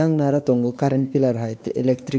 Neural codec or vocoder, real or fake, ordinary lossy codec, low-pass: codec, 16 kHz, 2 kbps, X-Codec, WavLM features, trained on Multilingual LibriSpeech; fake; none; none